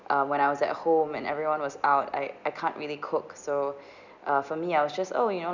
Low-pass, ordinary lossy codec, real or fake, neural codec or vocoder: 7.2 kHz; none; real; none